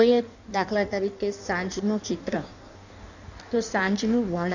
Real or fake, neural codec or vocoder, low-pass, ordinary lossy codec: fake; codec, 16 kHz in and 24 kHz out, 1.1 kbps, FireRedTTS-2 codec; 7.2 kHz; none